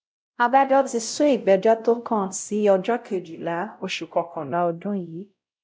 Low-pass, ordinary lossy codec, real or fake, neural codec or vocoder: none; none; fake; codec, 16 kHz, 0.5 kbps, X-Codec, WavLM features, trained on Multilingual LibriSpeech